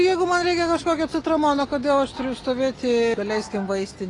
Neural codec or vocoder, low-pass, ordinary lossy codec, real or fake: none; 10.8 kHz; AAC, 32 kbps; real